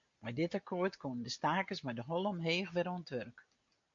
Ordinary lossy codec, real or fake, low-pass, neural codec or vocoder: MP3, 48 kbps; real; 7.2 kHz; none